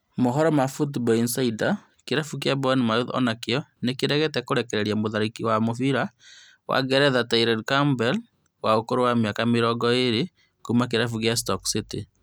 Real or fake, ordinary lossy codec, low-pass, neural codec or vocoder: real; none; none; none